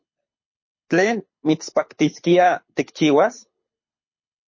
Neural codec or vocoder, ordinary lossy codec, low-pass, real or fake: codec, 24 kHz, 6 kbps, HILCodec; MP3, 32 kbps; 7.2 kHz; fake